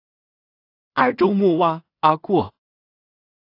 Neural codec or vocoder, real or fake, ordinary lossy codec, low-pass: codec, 16 kHz in and 24 kHz out, 0.4 kbps, LongCat-Audio-Codec, two codebook decoder; fake; AAC, 32 kbps; 5.4 kHz